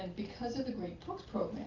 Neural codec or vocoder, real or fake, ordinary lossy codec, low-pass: none; real; Opus, 24 kbps; 7.2 kHz